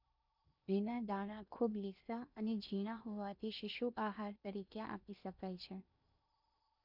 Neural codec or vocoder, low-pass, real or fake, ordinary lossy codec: codec, 16 kHz in and 24 kHz out, 0.8 kbps, FocalCodec, streaming, 65536 codes; 5.4 kHz; fake; none